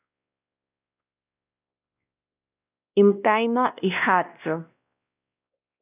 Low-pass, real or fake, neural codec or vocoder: 3.6 kHz; fake; codec, 16 kHz, 1 kbps, X-Codec, WavLM features, trained on Multilingual LibriSpeech